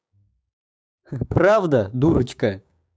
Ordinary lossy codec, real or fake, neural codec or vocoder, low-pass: none; fake; codec, 16 kHz, 6 kbps, DAC; none